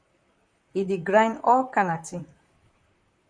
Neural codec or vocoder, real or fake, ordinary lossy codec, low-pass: vocoder, 44.1 kHz, 128 mel bands, Pupu-Vocoder; fake; MP3, 96 kbps; 9.9 kHz